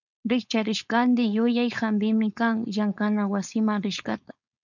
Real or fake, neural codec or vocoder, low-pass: fake; codec, 16 kHz, 4.8 kbps, FACodec; 7.2 kHz